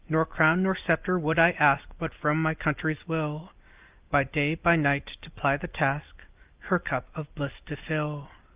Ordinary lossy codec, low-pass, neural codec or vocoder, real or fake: Opus, 32 kbps; 3.6 kHz; none; real